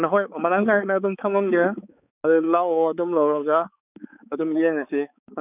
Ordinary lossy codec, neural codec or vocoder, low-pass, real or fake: none; codec, 16 kHz, 4 kbps, X-Codec, HuBERT features, trained on balanced general audio; 3.6 kHz; fake